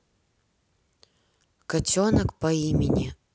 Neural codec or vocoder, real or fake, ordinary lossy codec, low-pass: none; real; none; none